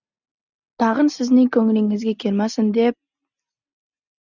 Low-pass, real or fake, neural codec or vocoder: 7.2 kHz; real; none